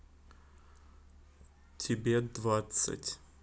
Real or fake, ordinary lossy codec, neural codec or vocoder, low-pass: real; none; none; none